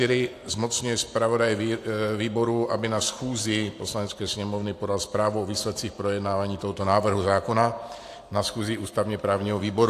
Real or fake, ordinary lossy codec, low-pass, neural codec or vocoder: fake; AAC, 64 kbps; 14.4 kHz; vocoder, 44.1 kHz, 128 mel bands every 512 samples, BigVGAN v2